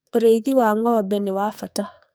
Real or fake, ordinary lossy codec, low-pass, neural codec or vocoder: fake; none; none; codec, 44.1 kHz, 2.6 kbps, SNAC